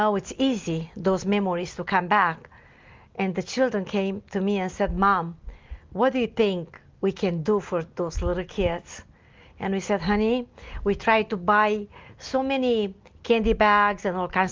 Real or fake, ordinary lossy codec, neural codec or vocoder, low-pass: real; Opus, 32 kbps; none; 7.2 kHz